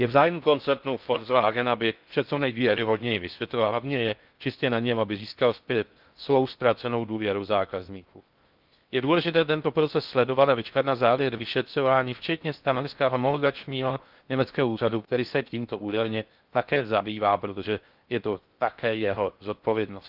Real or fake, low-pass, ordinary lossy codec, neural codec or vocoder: fake; 5.4 kHz; Opus, 32 kbps; codec, 16 kHz in and 24 kHz out, 0.6 kbps, FocalCodec, streaming, 4096 codes